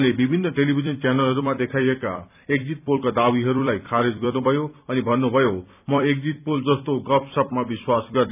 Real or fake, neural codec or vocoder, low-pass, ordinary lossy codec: fake; vocoder, 44.1 kHz, 128 mel bands every 256 samples, BigVGAN v2; 3.6 kHz; none